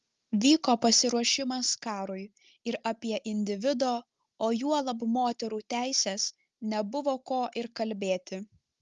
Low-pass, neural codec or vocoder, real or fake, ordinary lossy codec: 7.2 kHz; none; real; Opus, 32 kbps